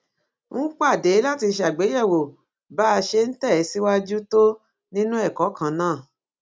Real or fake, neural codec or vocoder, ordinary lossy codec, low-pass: real; none; none; none